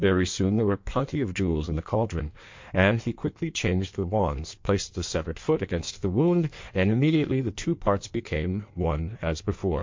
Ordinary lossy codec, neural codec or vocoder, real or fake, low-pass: MP3, 48 kbps; codec, 16 kHz in and 24 kHz out, 1.1 kbps, FireRedTTS-2 codec; fake; 7.2 kHz